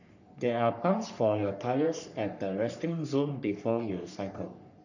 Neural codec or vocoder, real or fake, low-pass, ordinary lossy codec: codec, 44.1 kHz, 3.4 kbps, Pupu-Codec; fake; 7.2 kHz; none